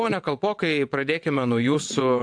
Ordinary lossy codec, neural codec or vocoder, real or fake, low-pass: AAC, 64 kbps; vocoder, 22.05 kHz, 80 mel bands, WaveNeXt; fake; 9.9 kHz